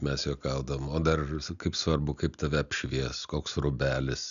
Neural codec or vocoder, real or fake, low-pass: none; real; 7.2 kHz